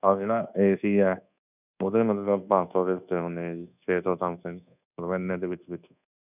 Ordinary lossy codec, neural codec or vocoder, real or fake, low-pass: none; codec, 24 kHz, 1.2 kbps, DualCodec; fake; 3.6 kHz